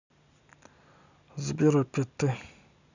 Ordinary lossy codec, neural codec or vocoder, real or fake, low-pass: none; none; real; 7.2 kHz